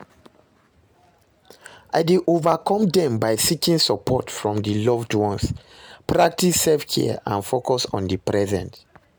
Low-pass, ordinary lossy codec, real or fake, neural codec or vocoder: none; none; real; none